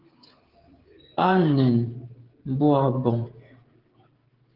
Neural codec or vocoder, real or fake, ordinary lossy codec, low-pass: codec, 16 kHz, 16 kbps, FreqCodec, smaller model; fake; Opus, 16 kbps; 5.4 kHz